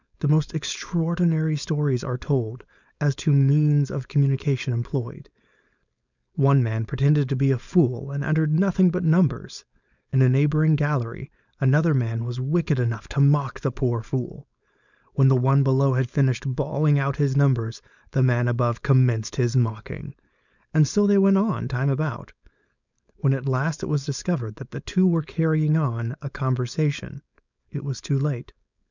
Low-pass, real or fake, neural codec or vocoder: 7.2 kHz; fake; codec, 16 kHz, 4.8 kbps, FACodec